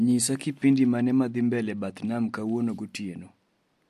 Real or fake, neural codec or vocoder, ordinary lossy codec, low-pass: real; none; MP3, 64 kbps; 14.4 kHz